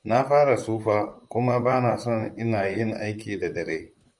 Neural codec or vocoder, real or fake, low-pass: vocoder, 44.1 kHz, 128 mel bands, Pupu-Vocoder; fake; 10.8 kHz